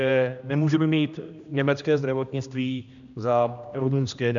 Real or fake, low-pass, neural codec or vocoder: fake; 7.2 kHz; codec, 16 kHz, 1 kbps, X-Codec, HuBERT features, trained on general audio